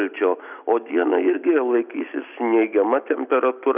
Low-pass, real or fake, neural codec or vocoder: 3.6 kHz; real; none